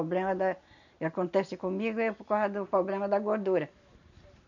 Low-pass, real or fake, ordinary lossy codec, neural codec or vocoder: 7.2 kHz; real; none; none